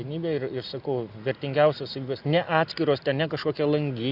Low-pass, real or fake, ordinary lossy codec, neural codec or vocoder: 5.4 kHz; real; Opus, 64 kbps; none